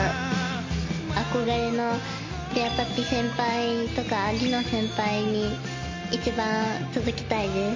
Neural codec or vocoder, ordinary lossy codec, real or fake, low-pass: none; MP3, 32 kbps; real; 7.2 kHz